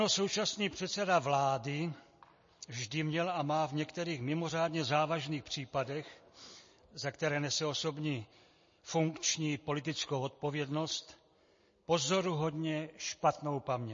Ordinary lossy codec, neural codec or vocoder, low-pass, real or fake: MP3, 32 kbps; none; 7.2 kHz; real